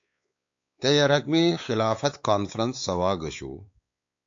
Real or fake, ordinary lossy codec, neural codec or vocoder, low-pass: fake; AAC, 64 kbps; codec, 16 kHz, 4 kbps, X-Codec, WavLM features, trained on Multilingual LibriSpeech; 7.2 kHz